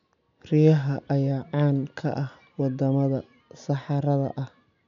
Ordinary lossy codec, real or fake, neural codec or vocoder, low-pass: MP3, 96 kbps; real; none; 7.2 kHz